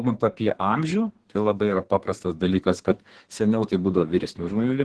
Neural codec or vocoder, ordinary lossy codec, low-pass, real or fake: codec, 32 kHz, 1.9 kbps, SNAC; Opus, 16 kbps; 10.8 kHz; fake